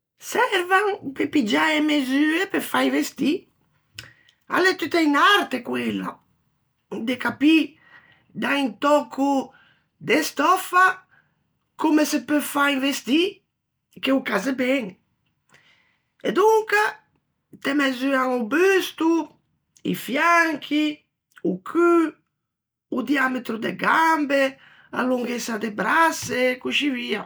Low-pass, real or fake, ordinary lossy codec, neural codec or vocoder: none; real; none; none